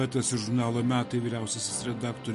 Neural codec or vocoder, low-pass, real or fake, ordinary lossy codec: none; 14.4 kHz; real; MP3, 48 kbps